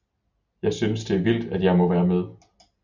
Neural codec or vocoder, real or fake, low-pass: none; real; 7.2 kHz